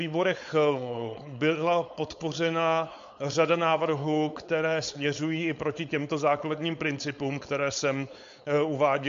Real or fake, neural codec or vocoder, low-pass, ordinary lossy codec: fake; codec, 16 kHz, 4.8 kbps, FACodec; 7.2 kHz; MP3, 48 kbps